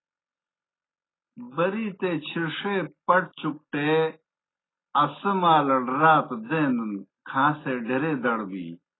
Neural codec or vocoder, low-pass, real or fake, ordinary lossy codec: none; 7.2 kHz; real; AAC, 16 kbps